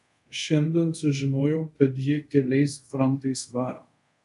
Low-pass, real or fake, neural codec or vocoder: 10.8 kHz; fake; codec, 24 kHz, 0.5 kbps, DualCodec